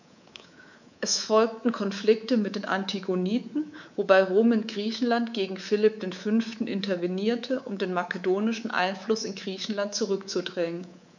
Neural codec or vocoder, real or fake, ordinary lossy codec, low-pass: codec, 24 kHz, 3.1 kbps, DualCodec; fake; none; 7.2 kHz